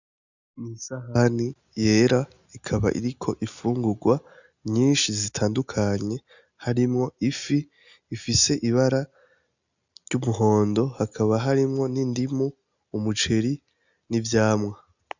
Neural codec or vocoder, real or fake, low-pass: none; real; 7.2 kHz